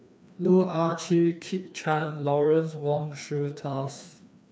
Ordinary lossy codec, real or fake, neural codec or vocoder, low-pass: none; fake; codec, 16 kHz, 2 kbps, FreqCodec, larger model; none